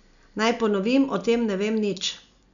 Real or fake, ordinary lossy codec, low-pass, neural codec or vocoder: real; none; 7.2 kHz; none